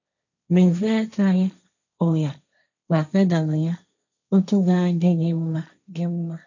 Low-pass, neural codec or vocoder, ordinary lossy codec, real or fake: 7.2 kHz; codec, 16 kHz, 1.1 kbps, Voila-Tokenizer; none; fake